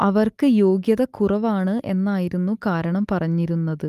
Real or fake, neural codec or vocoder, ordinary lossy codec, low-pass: real; none; Opus, 32 kbps; 14.4 kHz